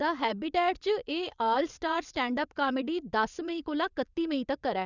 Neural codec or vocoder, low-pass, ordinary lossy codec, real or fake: vocoder, 22.05 kHz, 80 mel bands, WaveNeXt; 7.2 kHz; none; fake